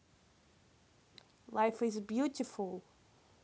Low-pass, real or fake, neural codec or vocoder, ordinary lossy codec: none; real; none; none